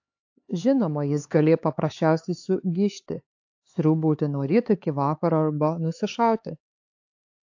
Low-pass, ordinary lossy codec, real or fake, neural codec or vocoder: 7.2 kHz; AAC, 48 kbps; fake; codec, 16 kHz, 4 kbps, X-Codec, HuBERT features, trained on LibriSpeech